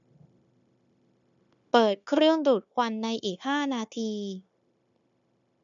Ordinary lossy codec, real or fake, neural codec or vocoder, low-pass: none; fake; codec, 16 kHz, 0.9 kbps, LongCat-Audio-Codec; 7.2 kHz